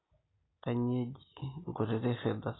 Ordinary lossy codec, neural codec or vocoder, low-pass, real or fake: AAC, 16 kbps; none; 7.2 kHz; real